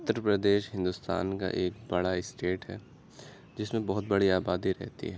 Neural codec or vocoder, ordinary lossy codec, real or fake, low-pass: none; none; real; none